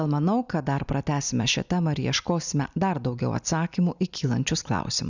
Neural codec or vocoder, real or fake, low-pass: none; real; 7.2 kHz